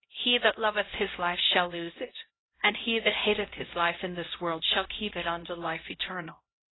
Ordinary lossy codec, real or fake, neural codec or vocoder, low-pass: AAC, 16 kbps; fake; codec, 16 kHz, 0.5 kbps, X-Codec, HuBERT features, trained on LibriSpeech; 7.2 kHz